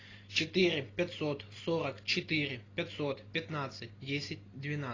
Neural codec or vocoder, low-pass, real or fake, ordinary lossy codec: none; 7.2 kHz; real; AAC, 32 kbps